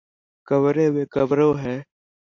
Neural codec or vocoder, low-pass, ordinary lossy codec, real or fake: none; 7.2 kHz; AAC, 48 kbps; real